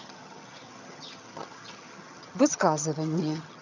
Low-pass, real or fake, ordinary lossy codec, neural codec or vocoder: 7.2 kHz; fake; none; vocoder, 22.05 kHz, 80 mel bands, HiFi-GAN